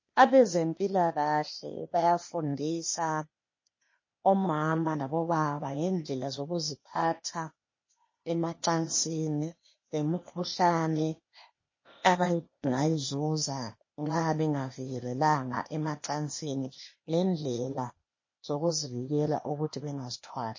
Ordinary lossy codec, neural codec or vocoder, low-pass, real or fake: MP3, 32 kbps; codec, 16 kHz, 0.8 kbps, ZipCodec; 7.2 kHz; fake